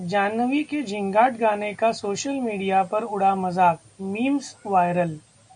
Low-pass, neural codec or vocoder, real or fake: 9.9 kHz; none; real